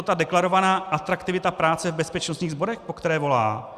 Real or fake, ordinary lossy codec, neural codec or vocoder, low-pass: real; Opus, 64 kbps; none; 14.4 kHz